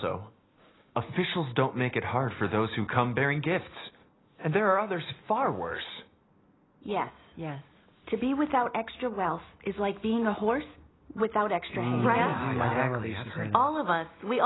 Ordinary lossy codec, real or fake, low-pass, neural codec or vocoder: AAC, 16 kbps; real; 7.2 kHz; none